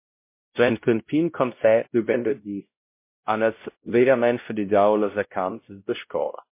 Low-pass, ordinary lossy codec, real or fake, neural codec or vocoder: 3.6 kHz; MP3, 24 kbps; fake; codec, 16 kHz, 0.5 kbps, X-Codec, WavLM features, trained on Multilingual LibriSpeech